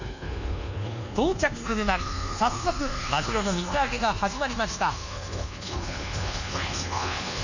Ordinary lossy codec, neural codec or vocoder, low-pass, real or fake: none; codec, 24 kHz, 1.2 kbps, DualCodec; 7.2 kHz; fake